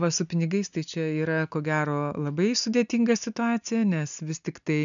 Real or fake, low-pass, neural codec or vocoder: real; 7.2 kHz; none